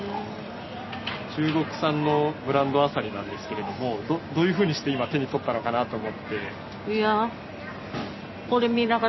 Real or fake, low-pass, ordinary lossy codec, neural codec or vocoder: real; 7.2 kHz; MP3, 24 kbps; none